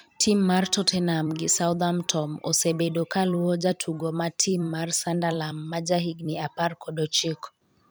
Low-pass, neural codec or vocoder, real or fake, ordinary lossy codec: none; vocoder, 44.1 kHz, 128 mel bands every 256 samples, BigVGAN v2; fake; none